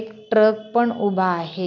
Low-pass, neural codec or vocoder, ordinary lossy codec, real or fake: 7.2 kHz; none; none; real